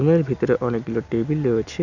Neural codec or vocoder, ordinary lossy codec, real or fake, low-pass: none; none; real; 7.2 kHz